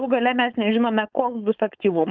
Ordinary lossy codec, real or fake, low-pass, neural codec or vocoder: Opus, 24 kbps; fake; 7.2 kHz; codec, 16 kHz, 4.8 kbps, FACodec